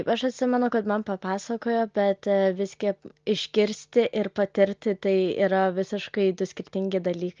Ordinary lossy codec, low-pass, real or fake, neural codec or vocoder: Opus, 24 kbps; 7.2 kHz; real; none